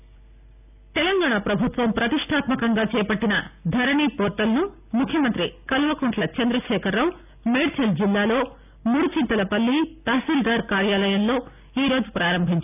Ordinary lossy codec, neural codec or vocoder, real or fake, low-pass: none; none; real; 3.6 kHz